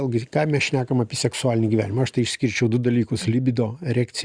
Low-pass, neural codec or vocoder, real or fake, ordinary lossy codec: 9.9 kHz; none; real; Opus, 64 kbps